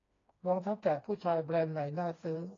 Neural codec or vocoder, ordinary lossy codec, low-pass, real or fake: codec, 16 kHz, 2 kbps, FreqCodec, smaller model; AAC, 32 kbps; 7.2 kHz; fake